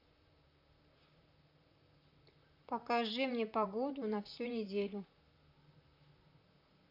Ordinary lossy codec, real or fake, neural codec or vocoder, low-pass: none; fake; vocoder, 44.1 kHz, 128 mel bands, Pupu-Vocoder; 5.4 kHz